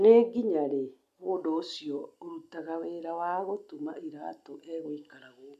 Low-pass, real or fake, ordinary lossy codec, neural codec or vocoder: 14.4 kHz; real; none; none